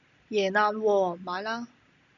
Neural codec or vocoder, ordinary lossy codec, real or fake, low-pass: none; MP3, 96 kbps; real; 7.2 kHz